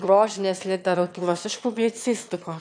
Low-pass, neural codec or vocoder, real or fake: 9.9 kHz; autoencoder, 22.05 kHz, a latent of 192 numbers a frame, VITS, trained on one speaker; fake